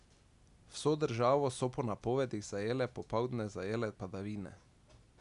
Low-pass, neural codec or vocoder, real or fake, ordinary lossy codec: 10.8 kHz; none; real; none